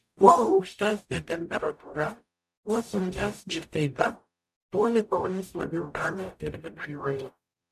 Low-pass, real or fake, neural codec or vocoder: 14.4 kHz; fake; codec, 44.1 kHz, 0.9 kbps, DAC